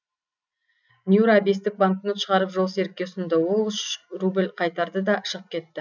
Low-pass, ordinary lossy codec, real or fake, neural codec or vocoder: 7.2 kHz; none; real; none